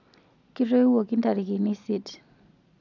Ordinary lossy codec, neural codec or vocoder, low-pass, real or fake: none; none; 7.2 kHz; real